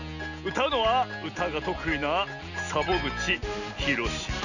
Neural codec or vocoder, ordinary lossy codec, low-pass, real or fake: none; none; 7.2 kHz; real